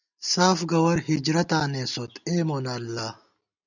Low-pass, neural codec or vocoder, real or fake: 7.2 kHz; none; real